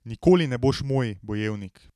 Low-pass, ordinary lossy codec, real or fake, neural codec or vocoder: 14.4 kHz; none; real; none